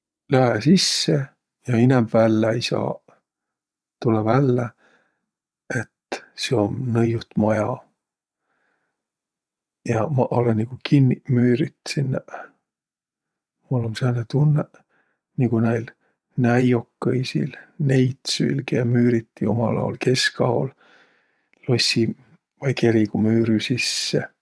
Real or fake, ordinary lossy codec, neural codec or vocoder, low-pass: fake; none; vocoder, 22.05 kHz, 80 mel bands, WaveNeXt; none